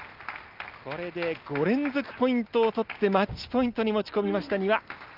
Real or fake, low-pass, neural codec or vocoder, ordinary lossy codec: real; 5.4 kHz; none; Opus, 32 kbps